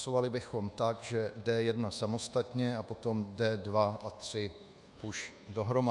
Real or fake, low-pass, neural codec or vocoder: fake; 10.8 kHz; codec, 24 kHz, 1.2 kbps, DualCodec